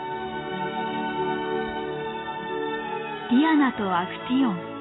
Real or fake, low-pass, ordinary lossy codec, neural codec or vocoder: real; 7.2 kHz; AAC, 16 kbps; none